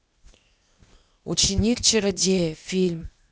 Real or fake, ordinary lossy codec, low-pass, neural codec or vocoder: fake; none; none; codec, 16 kHz, 0.8 kbps, ZipCodec